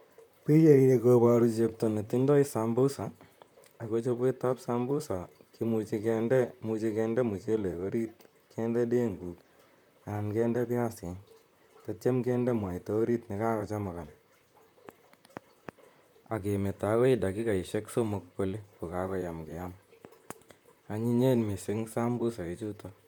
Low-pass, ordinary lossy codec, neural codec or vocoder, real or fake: none; none; vocoder, 44.1 kHz, 128 mel bands, Pupu-Vocoder; fake